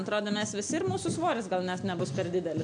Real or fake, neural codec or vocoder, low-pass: real; none; 9.9 kHz